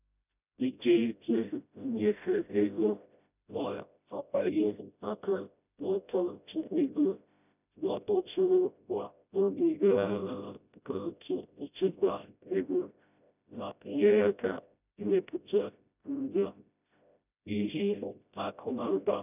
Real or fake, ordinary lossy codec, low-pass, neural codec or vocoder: fake; none; 3.6 kHz; codec, 16 kHz, 0.5 kbps, FreqCodec, smaller model